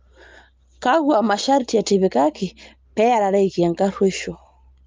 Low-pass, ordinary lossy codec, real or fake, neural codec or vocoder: 7.2 kHz; Opus, 32 kbps; real; none